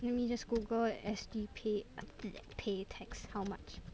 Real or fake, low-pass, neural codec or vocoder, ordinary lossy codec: real; none; none; none